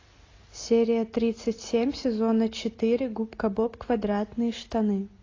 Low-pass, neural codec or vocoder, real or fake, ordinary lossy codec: 7.2 kHz; none; real; AAC, 32 kbps